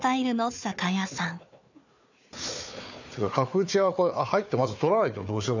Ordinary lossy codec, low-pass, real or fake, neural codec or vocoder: none; 7.2 kHz; fake; codec, 16 kHz, 4 kbps, FunCodec, trained on Chinese and English, 50 frames a second